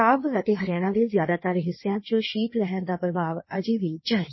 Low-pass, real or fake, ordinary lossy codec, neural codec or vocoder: 7.2 kHz; fake; MP3, 24 kbps; codec, 16 kHz in and 24 kHz out, 1.1 kbps, FireRedTTS-2 codec